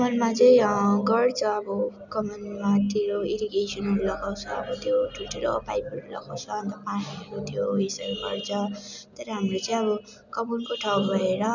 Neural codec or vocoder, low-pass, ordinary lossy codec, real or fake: none; 7.2 kHz; none; real